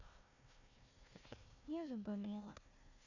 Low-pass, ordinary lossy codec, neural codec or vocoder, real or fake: 7.2 kHz; none; codec, 16 kHz, 1 kbps, FunCodec, trained on Chinese and English, 50 frames a second; fake